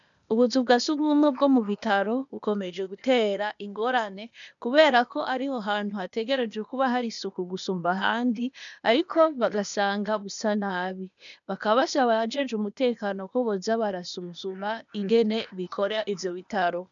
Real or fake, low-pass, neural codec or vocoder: fake; 7.2 kHz; codec, 16 kHz, 0.8 kbps, ZipCodec